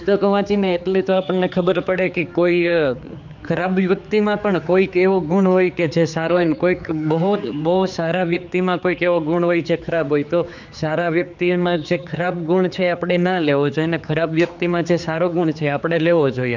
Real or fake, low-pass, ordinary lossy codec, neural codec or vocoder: fake; 7.2 kHz; none; codec, 16 kHz, 4 kbps, X-Codec, HuBERT features, trained on general audio